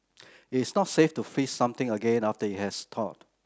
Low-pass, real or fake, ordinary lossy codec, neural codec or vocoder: none; real; none; none